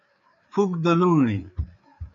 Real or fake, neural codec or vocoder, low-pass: fake; codec, 16 kHz, 4 kbps, FreqCodec, larger model; 7.2 kHz